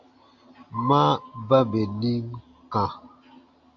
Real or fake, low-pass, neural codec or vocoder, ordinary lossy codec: real; 7.2 kHz; none; AAC, 48 kbps